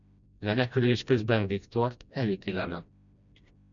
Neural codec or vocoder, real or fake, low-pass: codec, 16 kHz, 1 kbps, FreqCodec, smaller model; fake; 7.2 kHz